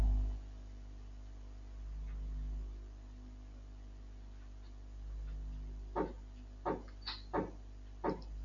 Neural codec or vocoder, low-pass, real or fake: none; 7.2 kHz; real